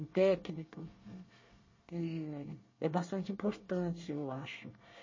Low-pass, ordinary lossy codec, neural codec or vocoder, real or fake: 7.2 kHz; MP3, 32 kbps; codec, 24 kHz, 1 kbps, SNAC; fake